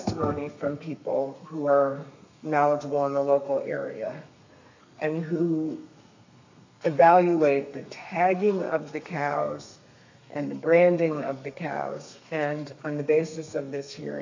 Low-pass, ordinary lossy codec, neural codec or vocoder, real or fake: 7.2 kHz; AAC, 48 kbps; codec, 32 kHz, 1.9 kbps, SNAC; fake